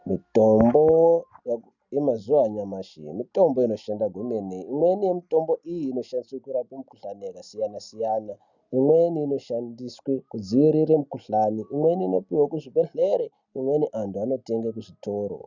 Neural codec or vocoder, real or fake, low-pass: none; real; 7.2 kHz